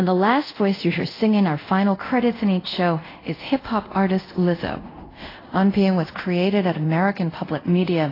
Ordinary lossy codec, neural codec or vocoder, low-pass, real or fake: AAC, 24 kbps; codec, 24 kHz, 0.5 kbps, DualCodec; 5.4 kHz; fake